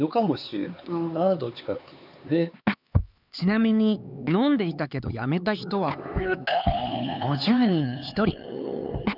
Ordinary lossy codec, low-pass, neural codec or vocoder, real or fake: none; 5.4 kHz; codec, 16 kHz, 4 kbps, X-Codec, HuBERT features, trained on LibriSpeech; fake